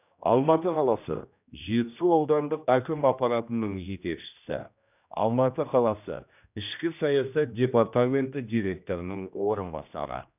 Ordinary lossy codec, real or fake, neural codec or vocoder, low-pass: none; fake; codec, 16 kHz, 1 kbps, X-Codec, HuBERT features, trained on general audio; 3.6 kHz